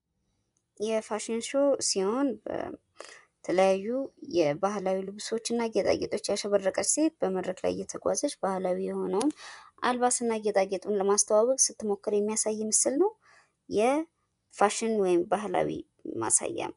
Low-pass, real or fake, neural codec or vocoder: 10.8 kHz; fake; vocoder, 24 kHz, 100 mel bands, Vocos